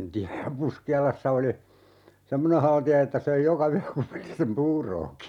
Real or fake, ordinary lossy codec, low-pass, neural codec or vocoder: real; none; 19.8 kHz; none